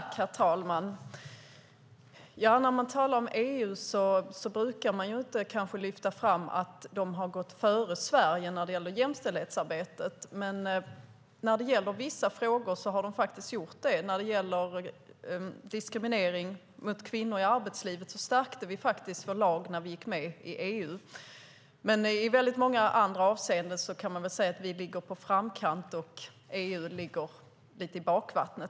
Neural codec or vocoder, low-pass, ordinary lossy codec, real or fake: none; none; none; real